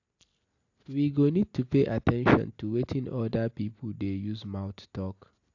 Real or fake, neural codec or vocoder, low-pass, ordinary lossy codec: real; none; 7.2 kHz; AAC, 48 kbps